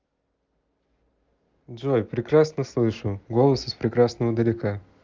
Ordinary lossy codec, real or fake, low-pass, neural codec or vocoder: Opus, 32 kbps; real; 7.2 kHz; none